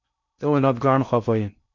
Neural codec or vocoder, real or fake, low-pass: codec, 16 kHz in and 24 kHz out, 0.6 kbps, FocalCodec, streaming, 2048 codes; fake; 7.2 kHz